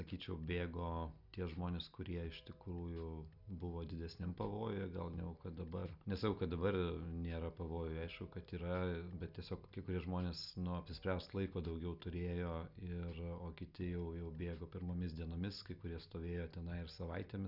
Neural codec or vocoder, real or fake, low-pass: none; real; 5.4 kHz